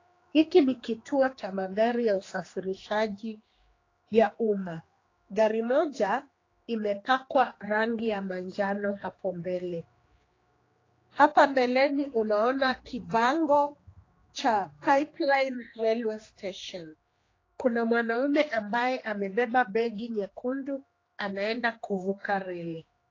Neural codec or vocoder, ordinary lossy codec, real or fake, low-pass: codec, 16 kHz, 2 kbps, X-Codec, HuBERT features, trained on general audio; AAC, 32 kbps; fake; 7.2 kHz